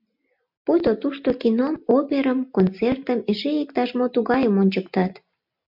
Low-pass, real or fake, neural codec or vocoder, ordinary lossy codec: 5.4 kHz; real; none; MP3, 48 kbps